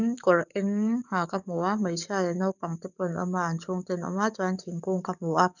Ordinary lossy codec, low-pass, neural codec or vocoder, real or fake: none; 7.2 kHz; codec, 44.1 kHz, 7.8 kbps, DAC; fake